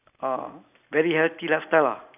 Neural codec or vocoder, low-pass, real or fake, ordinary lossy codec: none; 3.6 kHz; real; none